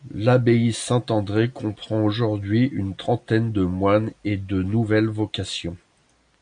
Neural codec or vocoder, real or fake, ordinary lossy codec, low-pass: none; real; AAC, 64 kbps; 9.9 kHz